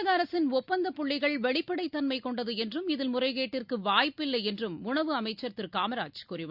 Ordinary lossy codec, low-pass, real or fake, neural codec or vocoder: Opus, 64 kbps; 5.4 kHz; real; none